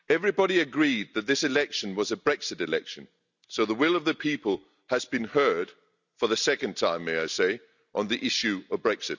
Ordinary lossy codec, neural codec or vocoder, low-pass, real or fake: none; none; 7.2 kHz; real